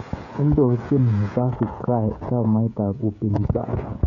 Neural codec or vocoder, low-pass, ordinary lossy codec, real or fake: codec, 16 kHz, 16 kbps, FreqCodec, smaller model; 7.2 kHz; none; fake